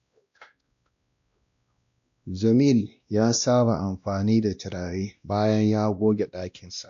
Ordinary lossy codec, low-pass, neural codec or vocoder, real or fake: AAC, 64 kbps; 7.2 kHz; codec, 16 kHz, 1 kbps, X-Codec, WavLM features, trained on Multilingual LibriSpeech; fake